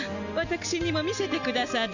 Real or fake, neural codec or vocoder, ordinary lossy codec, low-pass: real; none; none; 7.2 kHz